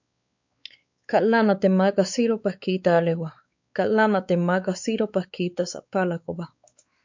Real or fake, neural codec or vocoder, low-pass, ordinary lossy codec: fake; codec, 16 kHz, 4 kbps, X-Codec, WavLM features, trained on Multilingual LibriSpeech; 7.2 kHz; MP3, 64 kbps